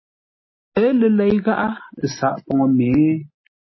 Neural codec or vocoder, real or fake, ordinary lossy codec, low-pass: none; real; MP3, 24 kbps; 7.2 kHz